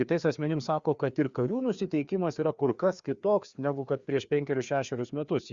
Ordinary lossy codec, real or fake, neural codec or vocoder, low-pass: Opus, 64 kbps; fake; codec, 16 kHz, 2 kbps, FreqCodec, larger model; 7.2 kHz